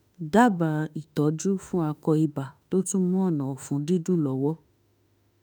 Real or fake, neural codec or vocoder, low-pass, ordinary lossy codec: fake; autoencoder, 48 kHz, 32 numbers a frame, DAC-VAE, trained on Japanese speech; none; none